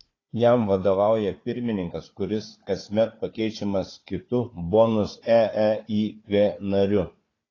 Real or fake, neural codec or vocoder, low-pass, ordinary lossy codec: fake; codec, 16 kHz, 4 kbps, FunCodec, trained on Chinese and English, 50 frames a second; 7.2 kHz; AAC, 32 kbps